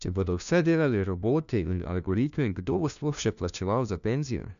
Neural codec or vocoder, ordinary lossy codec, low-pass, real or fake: codec, 16 kHz, 1 kbps, FunCodec, trained on LibriTTS, 50 frames a second; none; 7.2 kHz; fake